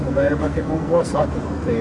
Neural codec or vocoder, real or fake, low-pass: codec, 44.1 kHz, 2.6 kbps, SNAC; fake; 10.8 kHz